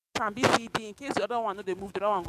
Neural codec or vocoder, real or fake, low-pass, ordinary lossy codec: codec, 44.1 kHz, 7.8 kbps, DAC; fake; 14.4 kHz; MP3, 96 kbps